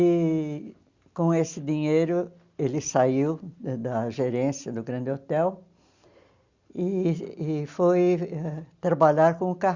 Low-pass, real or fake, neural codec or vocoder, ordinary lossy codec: 7.2 kHz; real; none; Opus, 64 kbps